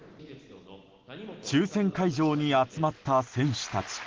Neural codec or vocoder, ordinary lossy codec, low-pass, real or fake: none; Opus, 16 kbps; 7.2 kHz; real